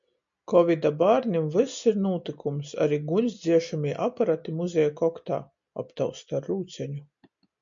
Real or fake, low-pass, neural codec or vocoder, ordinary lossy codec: real; 7.2 kHz; none; MP3, 64 kbps